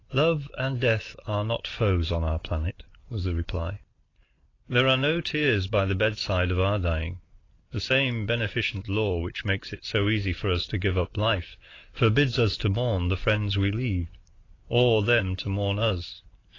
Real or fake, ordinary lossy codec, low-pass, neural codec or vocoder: real; AAC, 32 kbps; 7.2 kHz; none